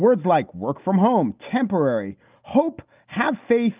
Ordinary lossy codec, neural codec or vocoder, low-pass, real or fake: Opus, 24 kbps; none; 3.6 kHz; real